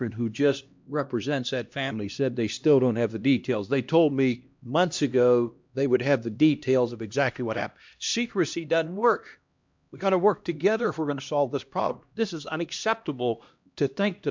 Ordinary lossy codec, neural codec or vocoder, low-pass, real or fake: MP3, 64 kbps; codec, 16 kHz, 1 kbps, X-Codec, HuBERT features, trained on LibriSpeech; 7.2 kHz; fake